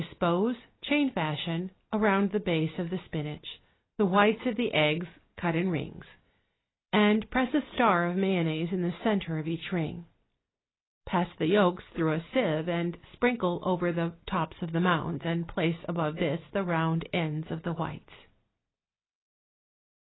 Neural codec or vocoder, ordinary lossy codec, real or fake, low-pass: none; AAC, 16 kbps; real; 7.2 kHz